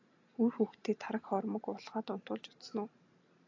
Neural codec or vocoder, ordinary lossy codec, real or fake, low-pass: none; AAC, 48 kbps; real; 7.2 kHz